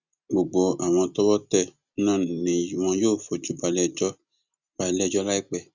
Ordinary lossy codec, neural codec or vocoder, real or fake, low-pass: Opus, 64 kbps; none; real; 7.2 kHz